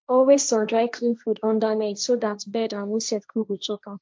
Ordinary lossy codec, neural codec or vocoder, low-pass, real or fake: none; codec, 16 kHz, 1.1 kbps, Voila-Tokenizer; none; fake